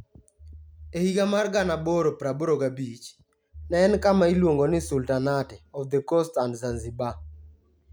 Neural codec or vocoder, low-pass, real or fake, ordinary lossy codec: none; none; real; none